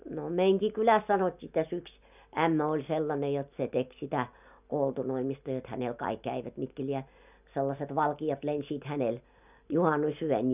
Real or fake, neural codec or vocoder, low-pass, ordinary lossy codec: real; none; 3.6 kHz; none